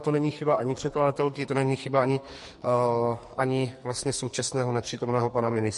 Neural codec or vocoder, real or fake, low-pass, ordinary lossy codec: codec, 44.1 kHz, 2.6 kbps, SNAC; fake; 14.4 kHz; MP3, 48 kbps